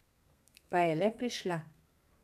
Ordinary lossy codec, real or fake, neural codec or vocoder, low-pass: none; fake; codec, 32 kHz, 1.9 kbps, SNAC; 14.4 kHz